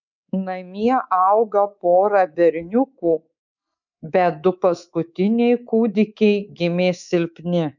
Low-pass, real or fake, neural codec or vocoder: 7.2 kHz; fake; codec, 24 kHz, 3.1 kbps, DualCodec